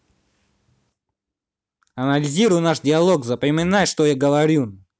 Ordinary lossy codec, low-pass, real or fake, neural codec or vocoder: none; none; real; none